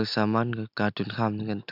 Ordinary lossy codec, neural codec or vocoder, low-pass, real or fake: Opus, 64 kbps; none; 5.4 kHz; real